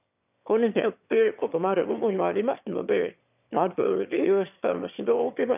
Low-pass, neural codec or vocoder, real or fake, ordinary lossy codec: 3.6 kHz; autoencoder, 22.05 kHz, a latent of 192 numbers a frame, VITS, trained on one speaker; fake; none